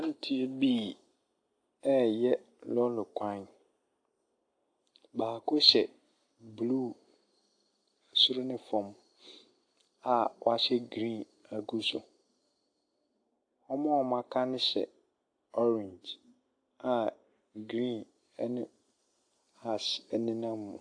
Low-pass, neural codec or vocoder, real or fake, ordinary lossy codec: 9.9 kHz; none; real; AAC, 48 kbps